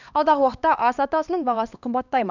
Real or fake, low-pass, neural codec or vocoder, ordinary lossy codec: fake; 7.2 kHz; codec, 16 kHz, 2 kbps, X-Codec, HuBERT features, trained on LibriSpeech; none